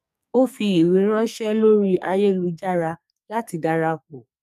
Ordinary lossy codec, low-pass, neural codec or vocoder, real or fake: none; 14.4 kHz; codec, 44.1 kHz, 2.6 kbps, SNAC; fake